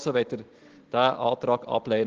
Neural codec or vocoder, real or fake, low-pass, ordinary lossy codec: none; real; 7.2 kHz; Opus, 16 kbps